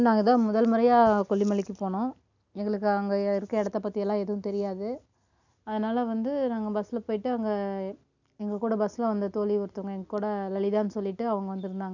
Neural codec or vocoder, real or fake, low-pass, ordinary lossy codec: none; real; 7.2 kHz; none